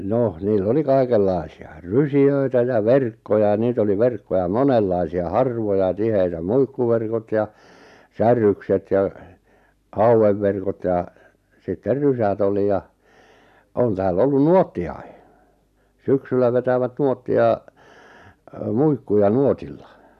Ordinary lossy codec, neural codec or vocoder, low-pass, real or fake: none; none; 14.4 kHz; real